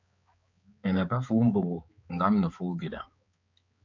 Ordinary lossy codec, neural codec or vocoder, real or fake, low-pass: MP3, 64 kbps; codec, 16 kHz, 4 kbps, X-Codec, HuBERT features, trained on balanced general audio; fake; 7.2 kHz